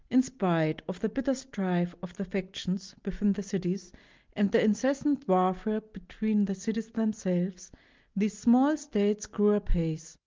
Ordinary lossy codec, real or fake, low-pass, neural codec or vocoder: Opus, 24 kbps; real; 7.2 kHz; none